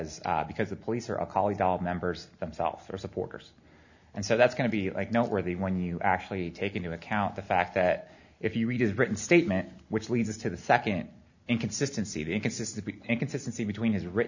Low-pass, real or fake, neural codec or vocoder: 7.2 kHz; real; none